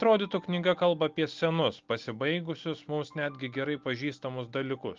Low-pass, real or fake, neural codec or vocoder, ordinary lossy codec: 7.2 kHz; real; none; Opus, 32 kbps